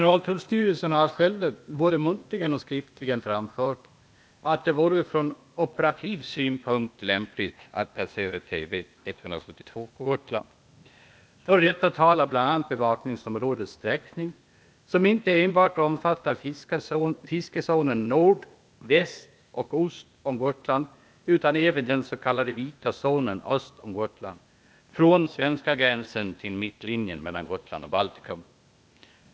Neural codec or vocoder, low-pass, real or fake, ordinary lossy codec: codec, 16 kHz, 0.8 kbps, ZipCodec; none; fake; none